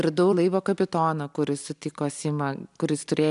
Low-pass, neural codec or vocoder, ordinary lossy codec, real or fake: 10.8 kHz; none; MP3, 96 kbps; real